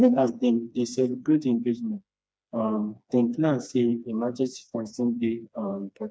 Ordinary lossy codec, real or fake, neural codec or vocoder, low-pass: none; fake; codec, 16 kHz, 2 kbps, FreqCodec, smaller model; none